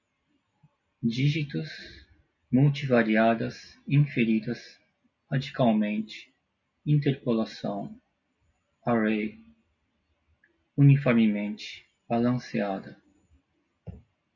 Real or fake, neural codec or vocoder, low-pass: real; none; 7.2 kHz